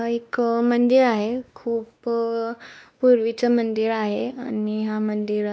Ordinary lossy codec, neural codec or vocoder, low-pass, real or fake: none; codec, 16 kHz, 2 kbps, X-Codec, WavLM features, trained on Multilingual LibriSpeech; none; fake